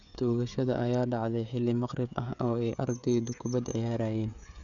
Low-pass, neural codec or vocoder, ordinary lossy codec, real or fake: 7.2 kHz; codec, 16 kHz, 16 kbps, FreqCodec, smaller model; none; fake